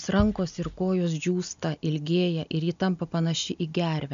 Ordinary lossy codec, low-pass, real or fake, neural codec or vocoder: AAC, 96 kbps; 7.2 kHz; real; none